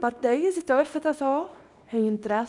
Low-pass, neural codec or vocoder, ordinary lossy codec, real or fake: 10.8 kHz; codec, 24 kHz, 0.9 kbps, WavTokenizer, small release; none; fake